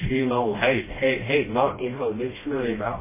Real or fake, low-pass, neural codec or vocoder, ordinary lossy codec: fake; 3.6 kHz; codec, 16 kHz, 1 kbps, FreqCodec, smaller model; MP3, 16 kbps